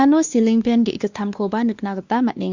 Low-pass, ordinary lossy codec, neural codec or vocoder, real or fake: 7.2 kHz; Opus, 64 kbps; codec, 16 kHz, 2 kbps, X-Codec, WavLM features, trained on Multilingual LibriSpeech; fake